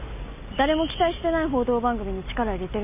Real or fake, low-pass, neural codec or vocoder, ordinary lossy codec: real; 3.6 kHz; none; none